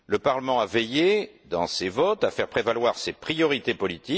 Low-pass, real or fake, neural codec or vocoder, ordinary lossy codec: none; real; none; none